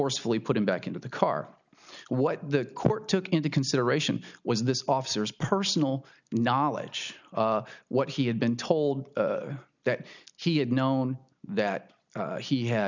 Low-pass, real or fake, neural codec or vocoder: 7.2 kHz; real; none